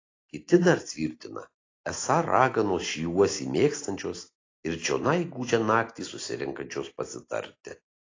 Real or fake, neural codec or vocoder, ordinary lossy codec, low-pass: real; none; AAC, 32 kbps; 7.2 kHz